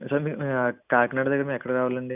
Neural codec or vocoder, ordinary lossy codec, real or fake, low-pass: none; none; real; 3.6 kHz